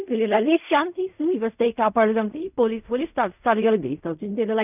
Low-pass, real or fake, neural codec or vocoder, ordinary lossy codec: 3.6 kHz; fake; codec, 16 kHz in and 24 kHz out, 0.4 kbps, LongCat-Audio-Codec, fine tuned four codebook decoder; none